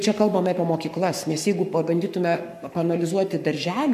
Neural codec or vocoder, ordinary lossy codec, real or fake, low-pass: codec, 44.1 kHz, 7.8 kbps, Pupu-Codec; MP3, 96 kbps; fake; 14.4 kHz